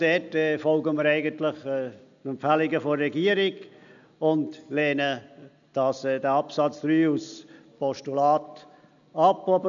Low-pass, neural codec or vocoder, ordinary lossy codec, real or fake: 7.2 kHz; none; none; real